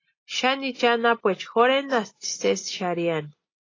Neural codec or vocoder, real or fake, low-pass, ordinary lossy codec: none; real; 7.2 kHz; AAC, 32 kbps